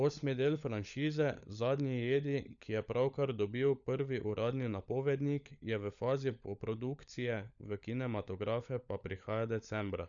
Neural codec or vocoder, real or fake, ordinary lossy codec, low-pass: codec, 16 kHz, 16 kbps, FunCodec, trained on LibriTTS, 50 frames a second; fake; none; 7.2 kHz